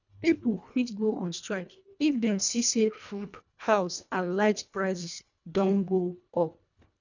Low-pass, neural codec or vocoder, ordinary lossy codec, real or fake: 7.2 kHz; codec, 24 kHz, 1.5 kbps, HILCodec; none; fake